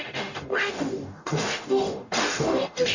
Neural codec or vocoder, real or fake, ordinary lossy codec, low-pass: codec, 44.1 kHz, 0.9 kbps, DAC; fake; none; 7.2 kHz